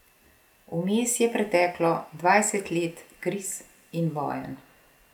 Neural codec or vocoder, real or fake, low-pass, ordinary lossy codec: none; real; 19.8 kHz; none